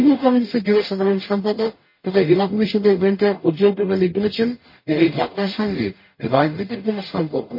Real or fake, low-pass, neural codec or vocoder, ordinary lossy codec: fake; 5.4 kHz; codec, 44.1 kHz, 0.9 kbps, DAC; MP3, 24 kbps